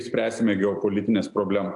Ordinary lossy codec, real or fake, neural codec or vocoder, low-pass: AAC, 64 kbps; real; none; 10.8 kHz